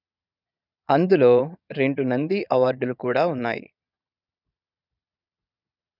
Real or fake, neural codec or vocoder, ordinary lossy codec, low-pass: fake; vocoder, 22.05 kHz, 80 mel bands, Vocos; none; 5.4 kHz